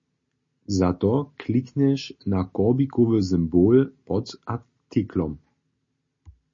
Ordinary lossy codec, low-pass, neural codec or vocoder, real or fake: MP3, 32 kbps; 7.2 kHz; none; real